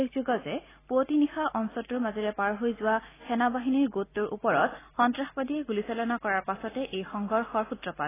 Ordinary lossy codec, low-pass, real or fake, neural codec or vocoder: AAC, 16 kbps; 3.6 kHz; real; none